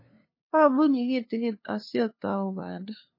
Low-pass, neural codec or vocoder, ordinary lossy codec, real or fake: 5.4 kHz; codec, 16 kHz, 2 kbps, FunCodec, trained on LibriTTS, 25 frames a second; MP3, 24 kbps; fake